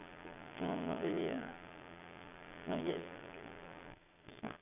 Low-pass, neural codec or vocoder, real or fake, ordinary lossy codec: 3.6 kHz; vocoder, 22.05 kHz, 80 mel bands, Vocos; fake; none